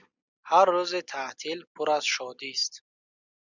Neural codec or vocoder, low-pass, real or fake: none; 7.2 kHz; real